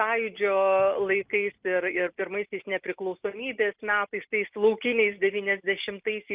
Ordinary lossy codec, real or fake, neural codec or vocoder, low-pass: Opus, 24 kbps; real; none; 3.6 kHz